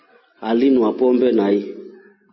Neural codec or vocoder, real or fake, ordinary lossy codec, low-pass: none; real; MP3, 24 kbps; 7.2 kHz